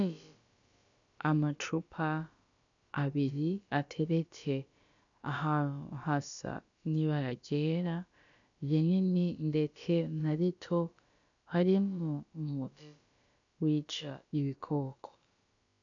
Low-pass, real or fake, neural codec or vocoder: 7.2 kHz; fake; codec, 16 kHz, about 1 kbps, DyCAST, with the encoder's durations